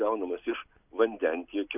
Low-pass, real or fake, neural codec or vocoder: 3.6 kHz; real; none